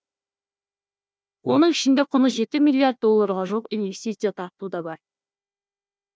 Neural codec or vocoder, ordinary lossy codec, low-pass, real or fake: codec, 16 kHz, 1 kbps, FunCodec, trained on Chinese and English, 50 frames a second; none; none; fake